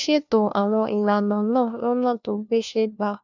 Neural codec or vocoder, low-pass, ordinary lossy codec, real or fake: codec, 16 kHz, 1 kbps, FunCodec, trained on LibriTTS, 50 frames a second; 7.2 kHz; none; fake